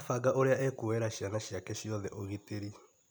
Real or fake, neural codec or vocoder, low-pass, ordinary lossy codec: real; none; none; none